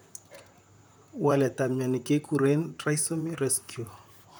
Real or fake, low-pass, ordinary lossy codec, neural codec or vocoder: fake; none; none; vocoder, 44.1 kHz, 128 mel bands, Pupu-Vocoder